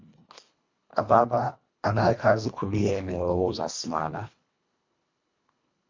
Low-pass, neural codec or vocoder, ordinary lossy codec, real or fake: 7.2 kHz; codec, 24 kHz, 1.5 kbps, HILCodec; MP3, 64 kbps; fake